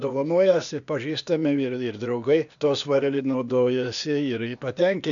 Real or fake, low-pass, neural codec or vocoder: fake; 7.2 kHz; codec, 16 kHz, 0.8 kbps, ZipCodec